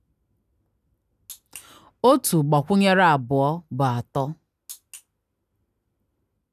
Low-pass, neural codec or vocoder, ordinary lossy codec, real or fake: 14.4 kHz; none; none; real